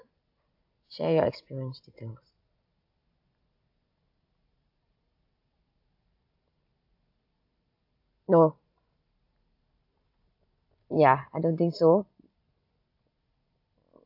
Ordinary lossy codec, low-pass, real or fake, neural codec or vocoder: AAC, 48 kbps; 5.4 kHz; real; none